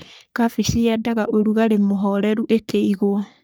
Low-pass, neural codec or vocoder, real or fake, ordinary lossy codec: none; codec, 44.1 kHz, 3.4 kbps, Pupu-Codec; fake; none